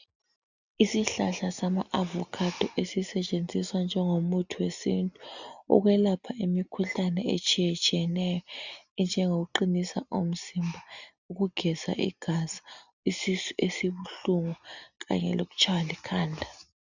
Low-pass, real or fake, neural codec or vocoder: 7.2 kHz; real; none